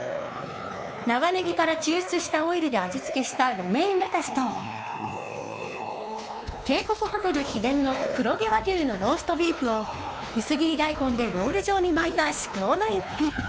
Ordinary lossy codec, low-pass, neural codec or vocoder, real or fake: none; none; codec, 16 kHz, 2 kbps, X-Codec, WavLM features, trained on Multilingual LibriSpeech; fake